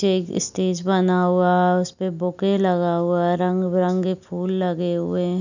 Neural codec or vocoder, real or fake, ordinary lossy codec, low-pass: none; real; none; 7.2 kHz